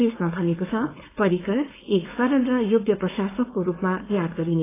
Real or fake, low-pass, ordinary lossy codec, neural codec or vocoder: fake; 3.6 kHz; AAC, 16 kbps; codec, 16 kHz, 4.8 kbps, FACodec